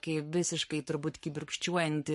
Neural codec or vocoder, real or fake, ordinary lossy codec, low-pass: codec, 44.1 kHz, 7.8 kbps, Pupu-Codec; fake; MP3, 48 kbps; 14.4 kHz